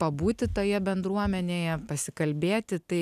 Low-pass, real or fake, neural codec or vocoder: 14.4 kHz; real; none